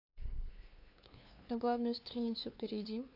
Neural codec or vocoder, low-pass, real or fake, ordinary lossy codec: codec, 16 kHz, 2 kbps, FunCodec, trained on LibriTTS, 25 frames a second; 5.4 kHz; fake; AAC, 32 kbps